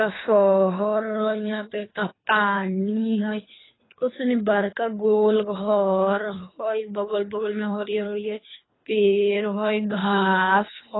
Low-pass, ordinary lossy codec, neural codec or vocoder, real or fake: 7.2 kHz; AAC, 16 kbps; codec, 24 kHz, 3 kbps, HILCodec; fake